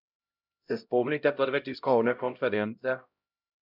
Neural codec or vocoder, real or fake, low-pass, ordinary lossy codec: codec, 16 kHz, 0.5 kbps, X-Codec, HuBERT features, trained on LibriSpeech; fake; 5.4 kHz; none